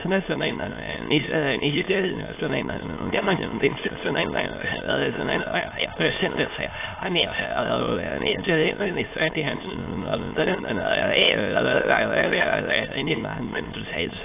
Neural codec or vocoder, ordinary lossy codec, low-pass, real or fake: autoencoder, 22.05 kHz, a latent of 192 numbers a frame, VITS, trained on many speakers; AAC, 24 kbps; 3.6 kHz; fake